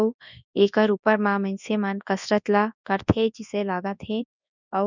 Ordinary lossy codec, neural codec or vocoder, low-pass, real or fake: none; codec, 24 kHz, 0.9 kbps, WavTokenizer, large speech release; 7.2 kHz; fake